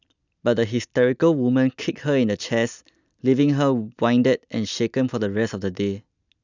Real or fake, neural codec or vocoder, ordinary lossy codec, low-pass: real; none; none; 7.2 kHz